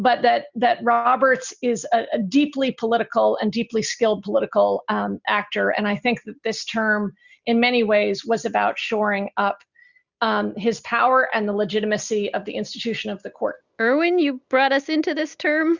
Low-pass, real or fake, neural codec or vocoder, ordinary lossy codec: 7.2 kHz; real; none; Opus, 64 kbps